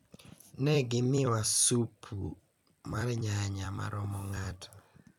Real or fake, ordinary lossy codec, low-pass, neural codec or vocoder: fake; none; 19.8 kHz; vocoder, 44.1 kHz, 128 mel bands every 256 samples, BigVGAN v2